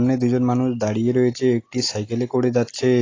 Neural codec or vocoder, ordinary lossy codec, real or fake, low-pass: none; AAC, 32 kbps; real; 7.2 kHz